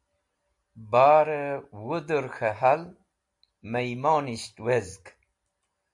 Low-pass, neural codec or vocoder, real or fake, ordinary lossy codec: 10.8 kHz; none; real; AAC, 64 kbps